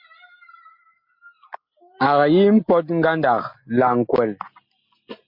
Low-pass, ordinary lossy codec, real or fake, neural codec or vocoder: 5.4 kHz; MP3, 32 kbps; real; none